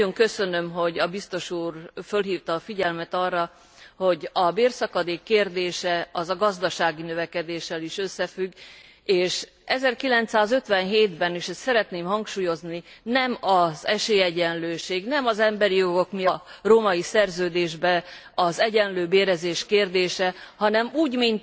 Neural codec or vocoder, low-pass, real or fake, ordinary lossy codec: none; none; real; none